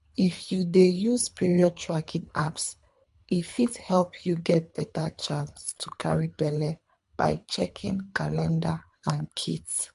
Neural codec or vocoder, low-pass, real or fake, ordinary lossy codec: codec, 24 kHz, 3 kbps, HILCodec; 10.8 kHz; fake; MP3, 64 kbps